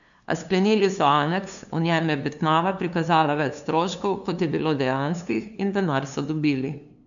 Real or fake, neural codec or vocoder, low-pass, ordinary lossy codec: fake; codec, 16 kHz, 2 kbps, FunCodec, trained on LibriTTS, 25 frames a second; 7.2 kHz; none